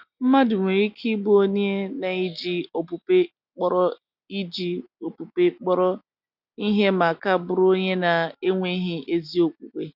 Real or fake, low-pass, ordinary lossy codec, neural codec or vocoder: real; 5.4 kHz; none; none